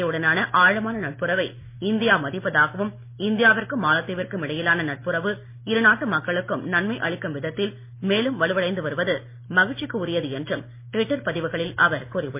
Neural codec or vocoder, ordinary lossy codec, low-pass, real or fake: none; MP3, 24 kbps; 3.6 kHz; real